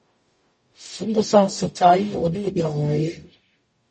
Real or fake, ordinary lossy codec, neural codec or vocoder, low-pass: fake; MP3, 32 kbps; codec, 44.1 kHz, 0.9 kbps, DAC; 10.8 kHz